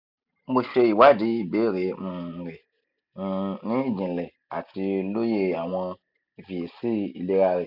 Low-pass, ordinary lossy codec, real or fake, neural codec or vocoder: 5.4 kHz; none; real; none